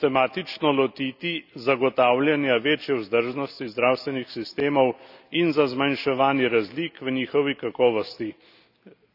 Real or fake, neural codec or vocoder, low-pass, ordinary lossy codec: real; none; 5.4 kHz; none